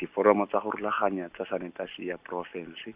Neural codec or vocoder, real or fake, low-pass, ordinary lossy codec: none; real; 3.6 kHz; Opus, 64 kbps